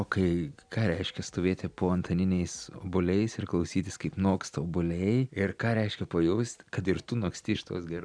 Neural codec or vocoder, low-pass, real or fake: none; 9.9 kHz; real